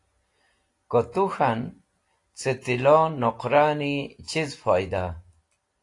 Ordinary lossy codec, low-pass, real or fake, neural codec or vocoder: AAC, 48 kbps; 10.8 kHz; real; none